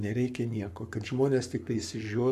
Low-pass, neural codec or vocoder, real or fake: 14.4 kHz; codec, 44.1 kHz, 7.8 kbps, Pupu-Codec; fake